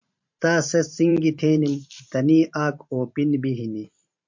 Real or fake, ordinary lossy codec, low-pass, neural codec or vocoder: fake; MP3, 48 kbps; 7.2 kHz; vocoder, 44.1 kHz, 128 mel bands every 512 samples, BigVGAN v2